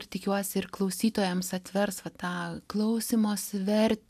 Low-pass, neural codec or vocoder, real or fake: 14.4 kHz; none; real